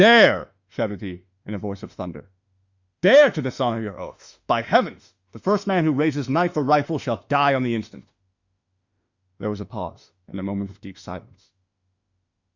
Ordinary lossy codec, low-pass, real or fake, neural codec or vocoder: Opus, 64 kbps; 7.2 kHz; fake; autoencoder, 48 kHz, 32 numbers a frame, DAC-VAE, trained on Japanese speech